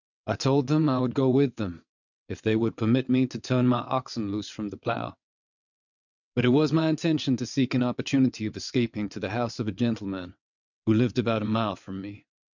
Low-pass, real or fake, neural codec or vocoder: 7.2 kHz; fake; vocoder, 22.05 kHz, 80 mel bands, WaveNeXt